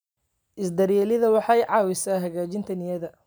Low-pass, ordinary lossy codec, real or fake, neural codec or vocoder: none; none; real; none